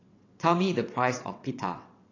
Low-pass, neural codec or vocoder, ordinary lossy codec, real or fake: 7.2 kHz; vocoder, 22.05 kHz, 80 mel bands, WaveNeXt; AAC, 32 kbps; fake